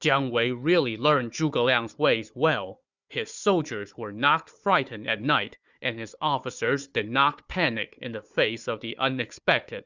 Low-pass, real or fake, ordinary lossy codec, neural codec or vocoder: 7.2 kHz; fake; Opus, 64 kbps; codec, 16 kHz, 4 kbps, FunCodec, trained on Chinese and English, 50 frames a second